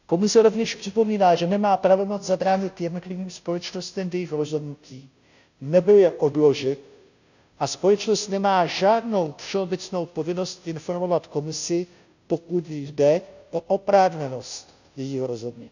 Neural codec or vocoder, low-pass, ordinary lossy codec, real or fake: codec, 16 kHz, 0.5 kbps, FunCodec, trained on Chinese and English, 25 frames a second; 7.2 kHz; none; fake